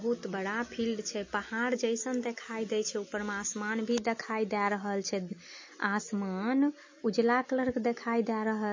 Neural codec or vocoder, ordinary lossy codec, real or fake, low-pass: none; MP3, 32 kbps; real; 7.2 kHz